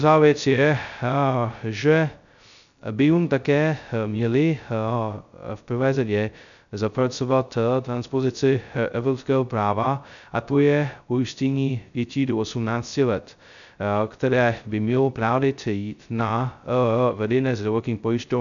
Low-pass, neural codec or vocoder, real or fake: 7.2 kHz; codec, 16 kHz, 0.2 kbps, FocalCodec; fake